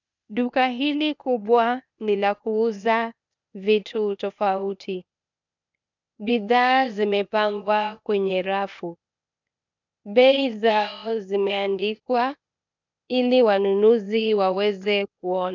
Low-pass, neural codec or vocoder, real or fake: 7.2 kHz; codec, 16 kHz, 0.8 kbps, ZipCodec; fake